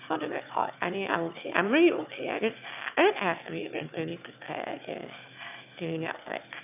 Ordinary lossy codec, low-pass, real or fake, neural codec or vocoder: none; 3.6 kHz; fake; autoencoder, 22.05 kHz, a latent of 192 numbers a frame, VITS, trained on one speaker